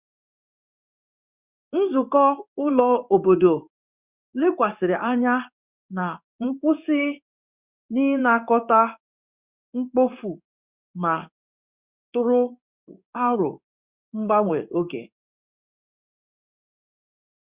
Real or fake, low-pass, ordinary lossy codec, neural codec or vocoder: fake; 3.6 kHz; Opus, 64 kbps; codec, 16 kHz in and 24 kHz out, 1 kbps, XY-Tokenizer